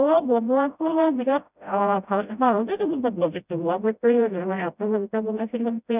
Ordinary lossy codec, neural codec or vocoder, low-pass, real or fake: none; codec, 16 kHz, 0.5 kbps, FreqCodec, smaller model; 3.6 kHz; fake